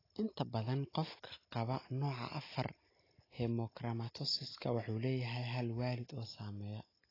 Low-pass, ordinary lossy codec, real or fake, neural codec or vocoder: 5.4 kHz; AAC, 24 kbps; real; none